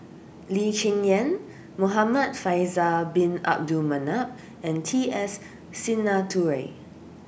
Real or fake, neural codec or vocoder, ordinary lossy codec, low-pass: real; none; none; none